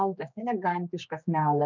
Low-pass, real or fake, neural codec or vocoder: 7.2 kHz; fake; codec, 16 kHz, 2 kbps, X-Codec, HuBERT features, trained on general audio